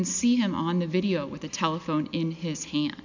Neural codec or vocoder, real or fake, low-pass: none; real; 7.2 kHz